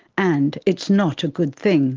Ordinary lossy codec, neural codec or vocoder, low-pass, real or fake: Opus, 16 kbps; none; 7.2 kHz; real